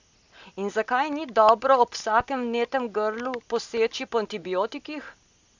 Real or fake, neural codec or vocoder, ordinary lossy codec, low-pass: real; none; Opus, 64 kbps; 7.2 kHz